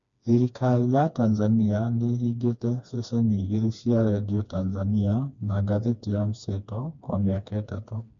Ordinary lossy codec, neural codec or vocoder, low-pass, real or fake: MP3, 64 kbps; codec, 16 kHz, 2 kbps, FreqCodec, smaller model; 7.2 kHz; fake